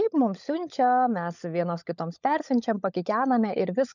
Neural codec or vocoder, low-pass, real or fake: codec, 16 kHz, 16 kbps, FunCodec, trained on LibriTTS, 50 frames a second; 7.2 kHz; fake